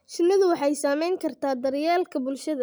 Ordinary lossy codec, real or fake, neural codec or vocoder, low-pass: none; real; none; none